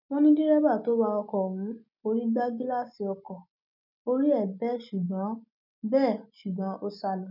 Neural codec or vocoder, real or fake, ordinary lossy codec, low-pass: none; real; none; 5.4 kHz